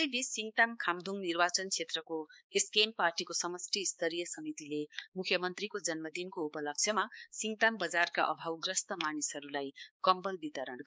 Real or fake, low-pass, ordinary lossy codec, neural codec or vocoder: fake; none; none; codec, 16 kHz, 4 kbps, X-Codec, HuBERT features, trained on balanced general audio